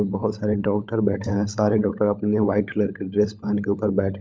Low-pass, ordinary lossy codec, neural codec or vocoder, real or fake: none; none; codec, 16 kHz, 16 kbps, FunCodec, trained on LibriTTS, 50 frames a second; fake